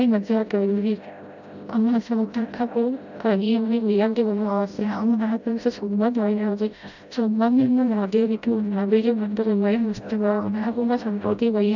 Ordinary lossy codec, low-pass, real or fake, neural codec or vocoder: none; 7.2 kHz; fake; codec, 16 kHz, 0.5 kbps, FreqCodec, smaller model